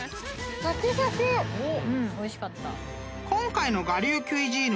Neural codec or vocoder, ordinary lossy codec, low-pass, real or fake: none; none; none; real